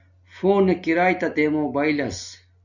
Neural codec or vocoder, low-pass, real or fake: none; 7.2 kHz; real